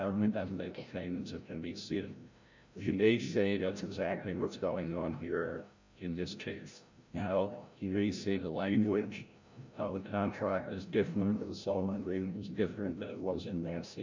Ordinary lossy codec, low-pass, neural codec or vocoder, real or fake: MP3, 64 kbps; 7.2 kHz; codec, 16 kHz, 0.5 kbps, FreqCodec, larger model; fake